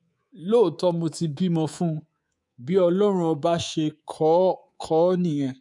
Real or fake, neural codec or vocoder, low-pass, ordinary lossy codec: fake; codec, 24 kHz, 3.1 kbps, DualCodec; 10.8 kHz; none